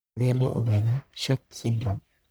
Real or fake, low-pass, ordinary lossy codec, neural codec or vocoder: fake; none; none; codec, 44.1 kHz, 1.7 kbps, Pupu-Codec